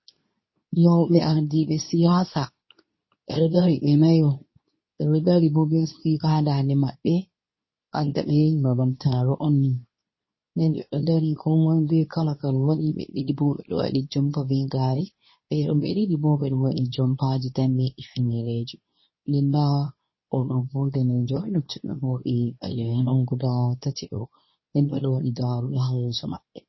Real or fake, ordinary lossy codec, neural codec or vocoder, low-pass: fake; MP3, 24 kbps; codec, 24 kHz, 0.9 kbps, WavTokenizer, medium speech release version 2; 7.2 kHz